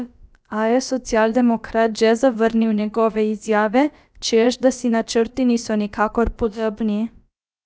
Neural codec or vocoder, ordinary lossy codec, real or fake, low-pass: codec, 16 kHz, about 1 kbps, DyCAST, with the encoder's durations; none; fake; none